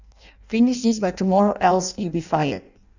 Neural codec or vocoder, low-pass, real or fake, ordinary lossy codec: codec, 16 kHz in and 24 kHz out, 0.6 kbps, FireRedTTS-2 codec; 7.2 kHz; fake; none